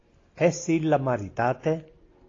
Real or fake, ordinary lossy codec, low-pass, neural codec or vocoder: real; AAC, 32 kbps; 7.2 kHz; none